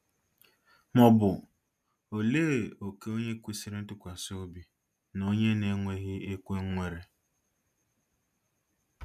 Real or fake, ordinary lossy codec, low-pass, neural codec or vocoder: real; none; 14.4 kHz; none